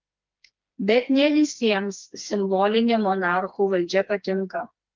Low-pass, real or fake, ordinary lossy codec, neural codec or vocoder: 7.2 kHz; fake; Opus, 32 kbps; codec, 16 kHz, 2 kbps, FreqCodec, smaller model